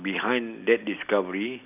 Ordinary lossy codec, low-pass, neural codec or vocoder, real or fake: none; 3.6 kHz; none; real